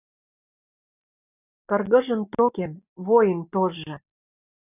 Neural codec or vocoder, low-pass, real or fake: none; 3.6 kHz; real